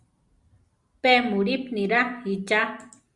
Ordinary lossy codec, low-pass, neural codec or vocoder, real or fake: Opus, 64 kbps; 10.8 kHz; none; real